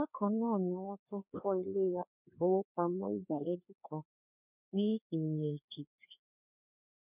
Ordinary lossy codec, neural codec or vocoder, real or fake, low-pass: none; codec, 16 kHz, 2 kbps, FreqCodec, larger model; fake; 3.6 kHz